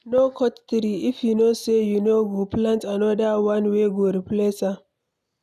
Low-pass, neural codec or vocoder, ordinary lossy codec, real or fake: 14.4 kHz; none; none; real